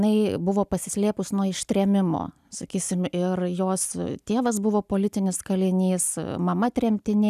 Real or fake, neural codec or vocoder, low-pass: real; none; 14.4 kHz